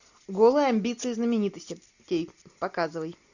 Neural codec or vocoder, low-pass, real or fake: none; 7.2 kHz; real